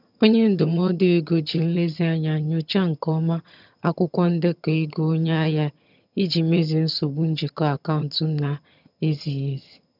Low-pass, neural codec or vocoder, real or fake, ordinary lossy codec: 5.4 kHz; vocoder, 22.05 kHz, 80 mel bands, HiFi-GAN; fake; none